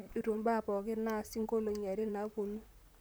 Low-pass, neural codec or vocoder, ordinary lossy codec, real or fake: none; vocoder, 44.1 kHz, 128 mel bands, Pupu-Vocoder; none; fake